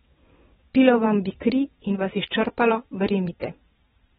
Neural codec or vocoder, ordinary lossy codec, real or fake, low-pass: vocoder, 22.05 kHz, 80 mel bands, WaveNeXt; AAC, 16 kbps; fake; 9.9 kHz